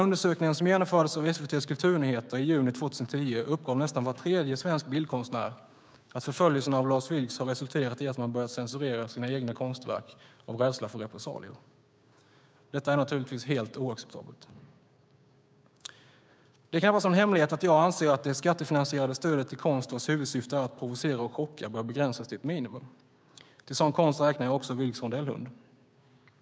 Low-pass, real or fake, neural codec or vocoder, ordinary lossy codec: none; fake; codec, 16 kHz, 6 kbps, DAC; none